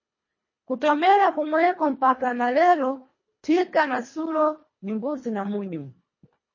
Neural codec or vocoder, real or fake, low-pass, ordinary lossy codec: codec, 24 kHz, 1.5 kbps, HILCodec; fake; 7.2 kHz; MP3, 32 kbps